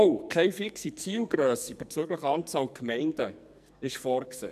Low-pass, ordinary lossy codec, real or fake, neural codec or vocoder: 14.4 kHz; none; fake; codec, 44.1 kHz, 2.6 kbps, SNAC